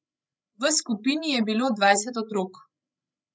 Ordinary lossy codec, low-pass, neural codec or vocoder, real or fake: none; none; codec, 16 kHz, 16 kbps, FreqCodec, larger model; fake